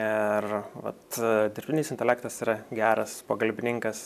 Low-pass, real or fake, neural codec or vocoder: 14.4 kHz; real; none